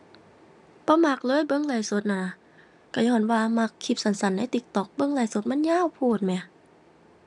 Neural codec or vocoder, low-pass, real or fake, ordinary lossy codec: none; 10.8 kHz; real; none